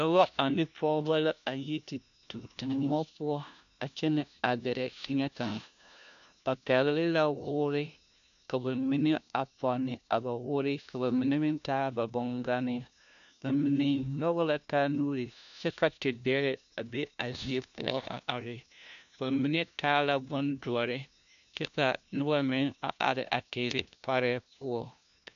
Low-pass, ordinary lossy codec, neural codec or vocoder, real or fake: 7.2 kHz; AAC, 96 kbps; codec, 16 kHz, 1 kbps, FunCodec, trained on LibriTTS, 50 frames a second; fake